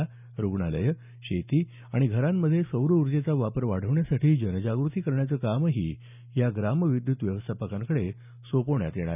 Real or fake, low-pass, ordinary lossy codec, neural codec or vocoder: real; 3.6 kHz; none; none